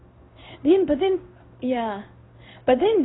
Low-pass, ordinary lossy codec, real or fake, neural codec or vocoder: 7.2 kHz; AAC, 16 kbps; fake; codec, 16 kHz in and 24 kHz out, 1 kbps, XY-Tokenizer